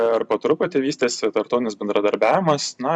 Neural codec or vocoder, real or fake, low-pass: vocoder, 44.1 kHz, 128 mel bands every 256 samples, BigVGAN v2; fake; 9.9 kHz